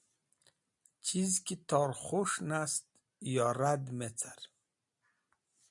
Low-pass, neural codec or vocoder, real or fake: 10.8 kHz; none; real